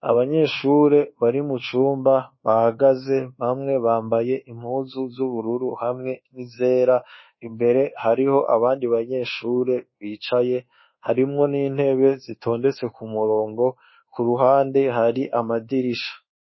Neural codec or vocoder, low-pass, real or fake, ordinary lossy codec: codec, 24 kHz, 1.2 kbps, DualCodec; 7.2 kHz; fake; MP3, 24 kbps